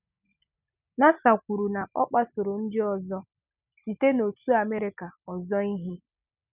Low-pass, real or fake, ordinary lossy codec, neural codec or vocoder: 3.6 kHz; real; none; none